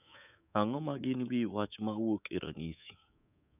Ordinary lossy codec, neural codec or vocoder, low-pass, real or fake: none; codec, 24 kHz, 3.1 kbps, DualCodec; 3.6 kHz; fake